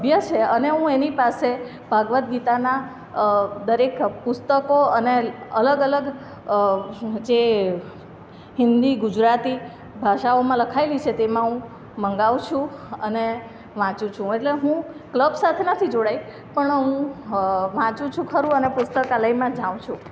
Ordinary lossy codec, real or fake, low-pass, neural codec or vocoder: none; real; none; none